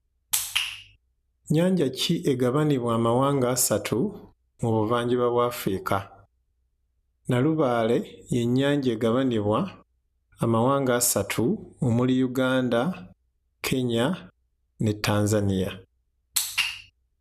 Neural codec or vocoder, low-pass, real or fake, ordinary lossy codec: none; 14.4 kHz; real; none